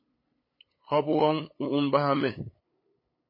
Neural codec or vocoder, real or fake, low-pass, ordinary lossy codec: codec, 16 kHz, 8 kbps, FunCodec, trained on LibriTTS, 25 frames a second; fake; 5.4 kHz; MP3, 24 kbps